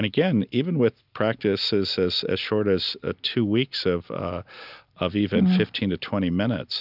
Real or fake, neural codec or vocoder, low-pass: real; none; 5.4 kHz